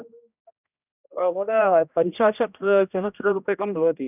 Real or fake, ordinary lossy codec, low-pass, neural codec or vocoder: fake; none; 3.6 kHz; codec, 16 kHz, 1 kbps, X-Codec, HuBERT features, trained on general audio